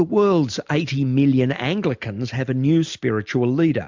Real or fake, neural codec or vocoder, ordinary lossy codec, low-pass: real; none; MP3, 64 kbps; 7.2 kHz